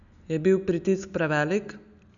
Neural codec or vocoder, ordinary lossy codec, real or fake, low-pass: none; none; real; 7.2 kHz